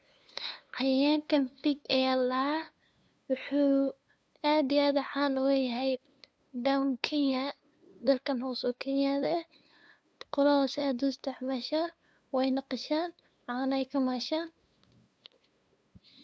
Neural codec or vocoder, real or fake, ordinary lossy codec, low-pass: codec, 16 kHz, 2 kbps, FunCodec, trained on LibriTTS, 25 frames a second; fake; none; none